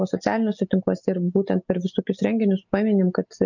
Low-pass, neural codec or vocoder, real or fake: 7.2 kHz; none; real